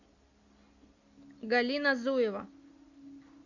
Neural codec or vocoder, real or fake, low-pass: none; real; 7.2 kHz